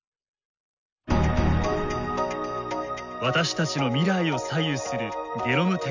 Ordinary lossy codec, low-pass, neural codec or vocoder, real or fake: none; 7.2 kHz; none; real